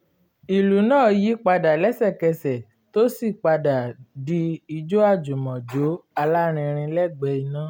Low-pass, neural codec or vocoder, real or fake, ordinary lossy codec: 19.8 kHz; none; real; none